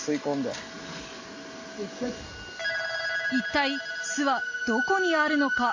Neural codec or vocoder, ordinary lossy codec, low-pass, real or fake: none; MP3, 32 kbps; 7.2 kHz; real